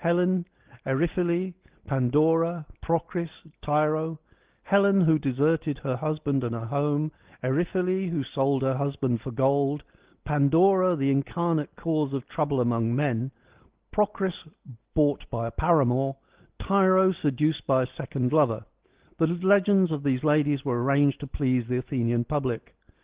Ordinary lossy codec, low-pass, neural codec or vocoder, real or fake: Opus, 16 kbps; 3.6 kHz; none; real